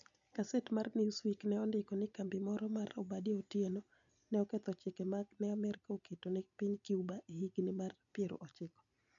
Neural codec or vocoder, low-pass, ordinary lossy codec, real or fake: none; 7.2 kHz; none; real